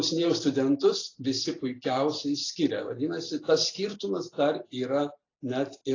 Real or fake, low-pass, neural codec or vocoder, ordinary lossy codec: real; 7.2 kHz; none; AAC, 32 kbps